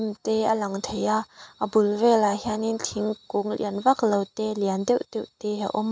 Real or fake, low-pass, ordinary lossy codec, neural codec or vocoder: real; none; none; none